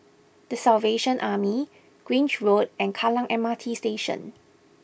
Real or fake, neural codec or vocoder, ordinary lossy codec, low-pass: real; none; none; none